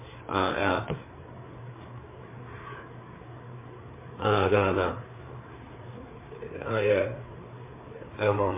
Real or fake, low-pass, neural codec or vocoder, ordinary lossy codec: fake; 3.6 kHz; codec, 16 kHz, 4 kbps, FreqCodec, larger model; MP3, 16 kbps